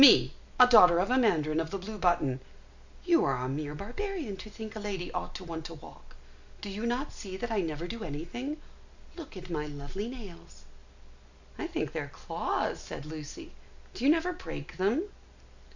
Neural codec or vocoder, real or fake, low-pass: none; real; 7.2 kHz